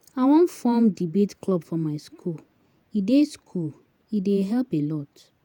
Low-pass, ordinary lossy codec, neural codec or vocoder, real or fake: 19.8 kHz; none; vocoder, 48 kHz, 128 mel bands, Vocos; fake